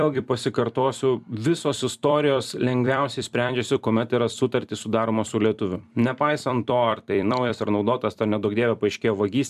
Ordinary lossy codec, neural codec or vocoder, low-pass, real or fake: MP3, 96 kbps; vocoder, 44.1 kHz, 128 mel bands every 256 samples, BigVGAN v2; 14.4 kHz; fake